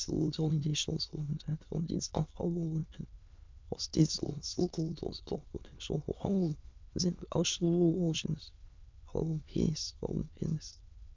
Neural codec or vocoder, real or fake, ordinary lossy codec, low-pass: autoencoder, 22.05 kHz, a latent of 192 numbers a frame, VITS, trained on many speakers; fake; MP3, 64 kbps; 7.2 kHz